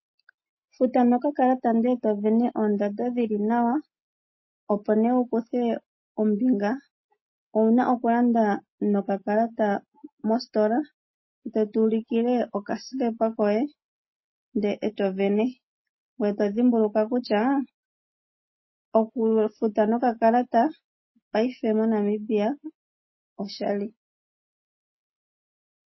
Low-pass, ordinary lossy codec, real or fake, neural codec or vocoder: 7.2 kHz; MP3, 24 kbps; real; none